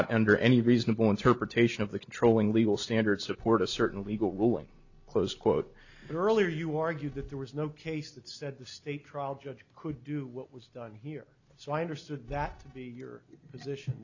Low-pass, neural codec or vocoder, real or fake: 7.2 kHz; none; real